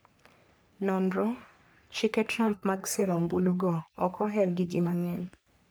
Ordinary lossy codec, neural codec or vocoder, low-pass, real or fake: none; codec, 44.1 kHz, 3.4 kbps, Pupu-Codec; none; fake